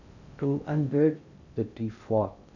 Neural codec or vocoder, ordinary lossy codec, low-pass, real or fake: codec, 16 kHz in and 24 kHz out, 0.6 kbps, FocalCodec, streaming, 4096 codes; none; 7.2 kHz; fake